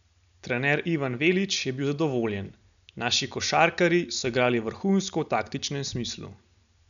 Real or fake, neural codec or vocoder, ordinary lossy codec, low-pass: real; none; none; 7.2 kHz